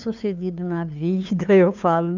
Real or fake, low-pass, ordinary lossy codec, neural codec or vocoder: fake; 7.2 kHz; none; codec, 16 kHz, 2 kbps, FunCodec, trained on LibriTTS, 25 frames a second